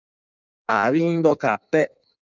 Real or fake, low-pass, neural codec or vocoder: fake; 7.2 kHz; codec, 16 kHz in and 24 kHz out, 1.1 kbps, FireRedTTS-2 codec